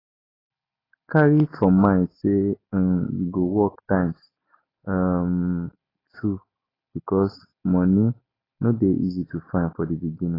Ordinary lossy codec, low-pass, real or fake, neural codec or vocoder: AAC, 24 kbps; 5.4 kHz; real; none